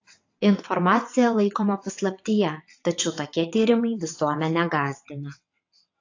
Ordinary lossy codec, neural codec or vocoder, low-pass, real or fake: AAC, 48 kbps; vocoder, 22.05 kHz, 80 mel bands, WaveNeXt; 7.2 kHz; fake